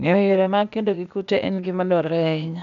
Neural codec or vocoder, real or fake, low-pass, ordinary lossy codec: codec, 16 kHz, 0.8 kbps, ZipCodec; fake; 7.2 kHz; none